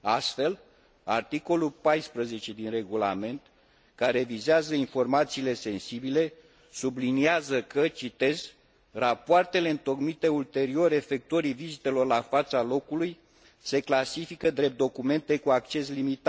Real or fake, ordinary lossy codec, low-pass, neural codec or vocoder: real; none; none; none